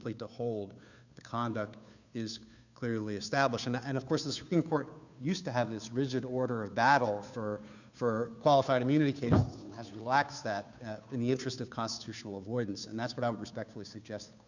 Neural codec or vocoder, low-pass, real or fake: codec, 16 kHz, 2 kbps, FunCodec, trained on Chinese and English, 25 frames a second; 7.2 kHz; fake